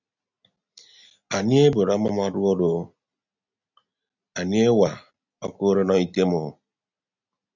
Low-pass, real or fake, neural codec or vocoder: 7.2 kHz; real; none